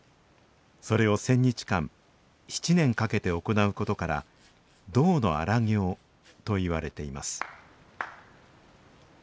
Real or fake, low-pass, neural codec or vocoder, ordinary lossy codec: real; none; none; none